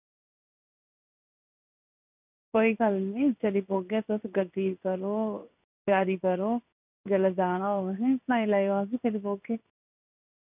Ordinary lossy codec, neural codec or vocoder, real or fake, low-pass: none; codec, 16 kHz in and 24 kHz out, 1 kbps, XY-Tokenizer; fake; 3.6 kHz